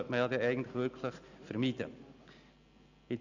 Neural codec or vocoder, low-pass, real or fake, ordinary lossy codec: none; 7.2 kHz; real; none